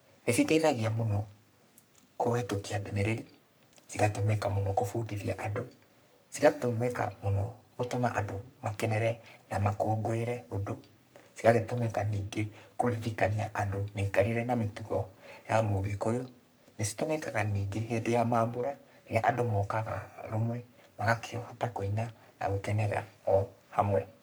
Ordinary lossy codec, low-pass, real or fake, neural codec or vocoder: none; none; fake; codec, 44.1 kHz, 3.4 kbps, Pupu-Codec